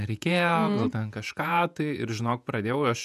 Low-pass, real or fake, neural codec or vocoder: 14.4 kHz; fake; vocoder, 48 kHz, 128 mel bands, Vocos